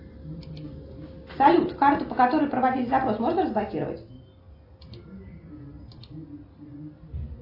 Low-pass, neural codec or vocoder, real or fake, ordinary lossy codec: 5.4 kHz; none; real; AAC, 32 kbps